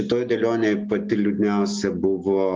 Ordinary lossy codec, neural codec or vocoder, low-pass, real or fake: Opus, 32 kbps; none; 7.2 kHz; real